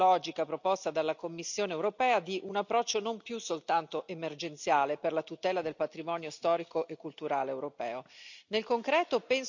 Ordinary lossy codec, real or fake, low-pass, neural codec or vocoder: none; real; 7.2 kHz; none